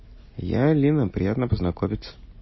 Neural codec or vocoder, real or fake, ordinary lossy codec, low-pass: none; real; MP3, 24 kbps; 7.2 kHz